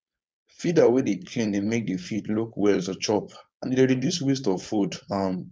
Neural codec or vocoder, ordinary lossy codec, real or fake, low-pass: codec, 16 kHz, 4.8 kbps, FACodec; none; fake; none